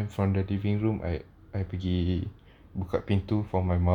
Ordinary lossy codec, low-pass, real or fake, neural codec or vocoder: none; 19.8 kHz; real; none